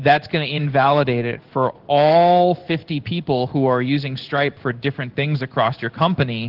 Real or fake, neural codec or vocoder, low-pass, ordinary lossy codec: fake; codec, 16 kHz in and 24 kHz out, 1 kbps, XY-Tokenizer; 5.4 kHz; Opus, 16 kbps